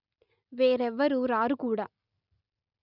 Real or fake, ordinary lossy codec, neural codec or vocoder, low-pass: real; none; none; 5.4 kHz